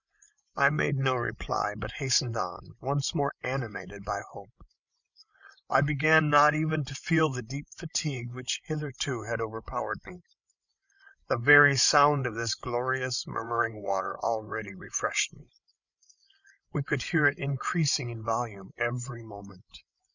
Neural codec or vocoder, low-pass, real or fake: codec, 16 kHz, 16 kbps, FreqCodec, larger model; 7.2 kHz; fake